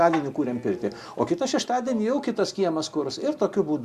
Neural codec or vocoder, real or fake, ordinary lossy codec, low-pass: none; real; Opus, 64 kbps; 14.4 kHz